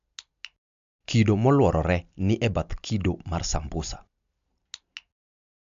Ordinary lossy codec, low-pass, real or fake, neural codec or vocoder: MP3, 64 kbps; 7.2 kHz; real; none